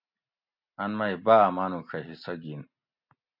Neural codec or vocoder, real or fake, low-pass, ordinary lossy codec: none; real; 5.4 kHz; MP3, 48 kbps